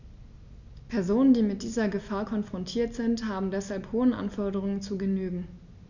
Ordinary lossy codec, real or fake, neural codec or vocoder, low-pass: none; real; none; 7.2 kHz